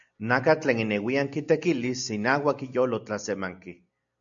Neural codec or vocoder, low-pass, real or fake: none; 7.2 kHz; real